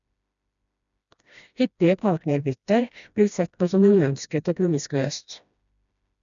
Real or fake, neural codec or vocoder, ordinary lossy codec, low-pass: fake; codec, 16 kHz, 1 kbps, FreqCodec, smaller model; none; 7.2 kHz